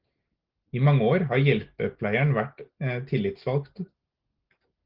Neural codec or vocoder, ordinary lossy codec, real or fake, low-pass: none; Opus, 16 kbps; real; 5.4 kHz